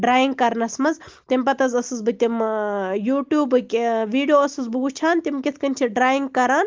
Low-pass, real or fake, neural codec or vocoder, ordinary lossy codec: 7.2 kHz; real; none; Opus, 32 kbps